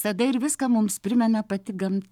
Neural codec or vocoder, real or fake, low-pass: codec, 44.1 kHz, 7.8 kbps, Pupu-Codec; fake; 19.8 kHz